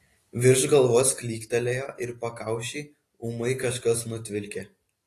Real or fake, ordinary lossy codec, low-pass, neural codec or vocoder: real; AAC, 48 kbps; 14.4 kHz; none